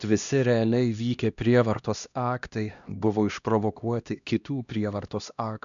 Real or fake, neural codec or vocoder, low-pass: fake; codec, 16 kHz, 1 kbps, X-Codec, HuBERT features, trained on LibriSpeech; 7.2 kHz